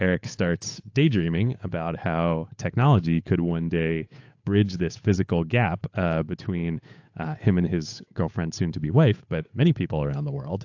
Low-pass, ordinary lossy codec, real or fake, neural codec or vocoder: 7.2 kHz; AAC, 48 kbps; fake; codec, 16 kHz, 8 kbps, FunCodec, trained on Chinese and English, 25 frames a second